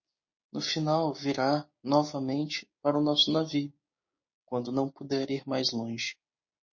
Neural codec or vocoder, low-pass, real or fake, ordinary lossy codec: codec, 16 kHz, 6 kbps, DAC; 7.2 kHz; fake; MP3, 32 kbps